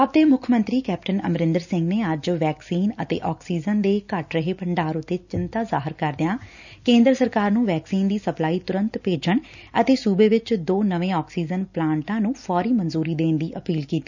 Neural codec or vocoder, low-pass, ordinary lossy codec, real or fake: none; 7.2 kHz; none; real